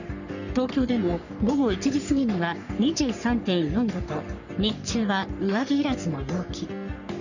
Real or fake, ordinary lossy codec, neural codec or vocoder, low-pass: fake; none; codec, 44.1 kHz, 3.4 kbps, Pupu-Codec; 7.2 kHz